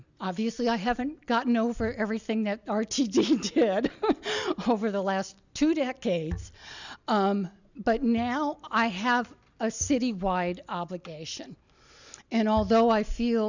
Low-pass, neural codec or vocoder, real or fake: 7.2 kHz; none; real